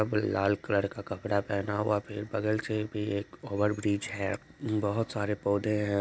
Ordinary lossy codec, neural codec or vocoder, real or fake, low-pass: none; none; real; none